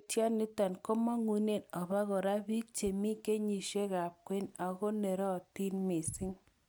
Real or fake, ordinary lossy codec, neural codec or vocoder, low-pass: real; none; none; none